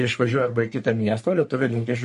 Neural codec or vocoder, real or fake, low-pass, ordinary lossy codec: codec, 44.1 kHz, 3.4 kbps, Pupu-Codec; fake; 14.4 kHz; MP3, 48 kbps